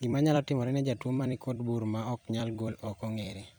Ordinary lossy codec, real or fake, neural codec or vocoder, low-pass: none; fake; vocoder, 44.1 kHz, 128 mel bands every 256 samples, BigVGAN v2; none